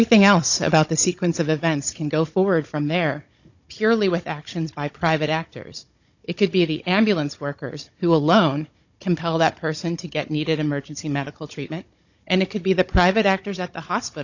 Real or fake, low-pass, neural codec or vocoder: fake; 7.2 kHz; codec, 16 kHz, 16 kbps, FunCodec, trained on Chinese and English, 50 frames a second